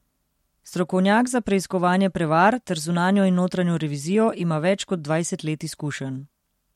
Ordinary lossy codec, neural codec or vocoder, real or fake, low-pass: MP3, 64 kbps; none; real; 19.8 kHz